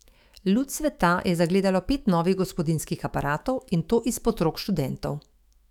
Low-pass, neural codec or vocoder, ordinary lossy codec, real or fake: 19.8 kHz; autoencoder, 48 kHz, 128 numbers a frame, DAC-VAE, trained on Japanese speech; none; fake